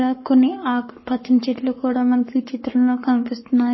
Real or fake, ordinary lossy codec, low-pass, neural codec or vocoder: fake; MP3, 24 kbps; 7.2 kHz; codec, 44.1 kHz, 7.8 kbps, Pupu-Codec